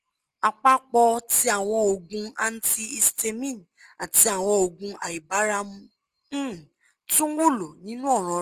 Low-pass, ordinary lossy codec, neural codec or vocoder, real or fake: 14.4 kHz; Opus, 24 kbps; none; real